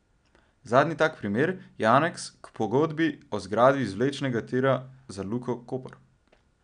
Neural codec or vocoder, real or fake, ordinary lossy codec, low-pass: none; real; none; 9.9 kHz